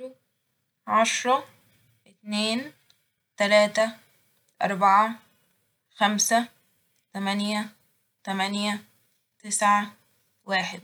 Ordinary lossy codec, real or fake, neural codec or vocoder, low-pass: none; real; none; none